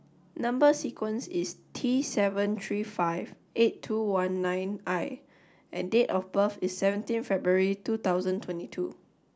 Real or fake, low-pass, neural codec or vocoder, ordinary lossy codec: real; none; none; none